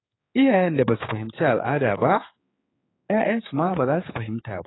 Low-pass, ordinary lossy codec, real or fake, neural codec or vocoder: 7.2 kHz; AAC, 16 kbps; fake; codec, 16 kHz, 4 kbps, X-Codec, HuBERT features, trained on general audio